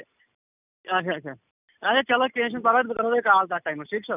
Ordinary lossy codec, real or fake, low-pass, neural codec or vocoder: none; real; 3.6 kHz; none